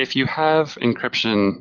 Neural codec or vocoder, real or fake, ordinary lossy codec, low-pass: none; real; Opus, 32 kbps; 7.2 kHz